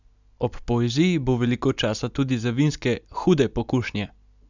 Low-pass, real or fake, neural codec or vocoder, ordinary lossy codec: 7.2 kHz; real; none; none